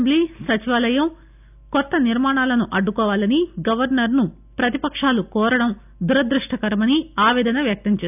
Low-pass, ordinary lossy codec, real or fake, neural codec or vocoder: 3.6 kHz; none; real; none